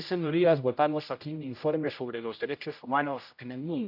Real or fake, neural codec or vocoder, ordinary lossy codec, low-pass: fake; codec, 16 kHz, 0.5 kbps, X-Codec, HuBERT features, trained on general audio; AAC, 48 kbps; 5.4 kHz